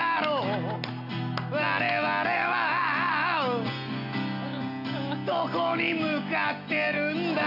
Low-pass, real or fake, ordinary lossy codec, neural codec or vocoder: 5.4 kHz; real; none; none